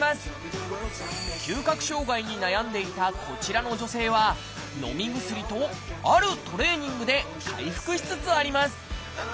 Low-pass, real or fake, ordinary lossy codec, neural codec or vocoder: none; real; none; none